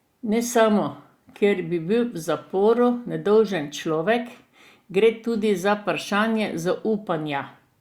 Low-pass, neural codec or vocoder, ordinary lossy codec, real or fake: 19.8 kHz; none; Opus, 64 kbps; real